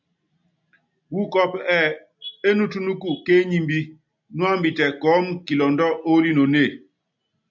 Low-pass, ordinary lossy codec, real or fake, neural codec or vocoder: 7.2 kHz; MP3, 64 kbps; real; none